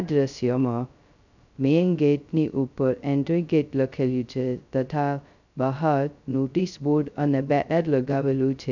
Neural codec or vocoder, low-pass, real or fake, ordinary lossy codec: codec, 16 kHz, 0.2 kbps, FocalCodec; 7.2 kHz; fake; none